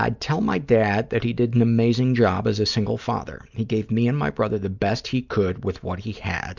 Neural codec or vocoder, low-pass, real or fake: none; 7.2 kHz; real